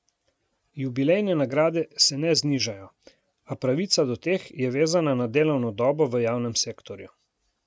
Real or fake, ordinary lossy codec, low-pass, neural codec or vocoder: real; none; none; none